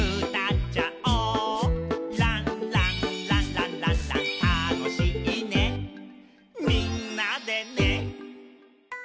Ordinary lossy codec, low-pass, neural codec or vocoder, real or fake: none; none; none; real